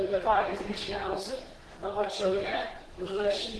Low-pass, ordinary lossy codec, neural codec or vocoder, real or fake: 10.8 kHz; Opus, 16 kbps; codec, 24 kHz, 1 kbps, SNAC; fake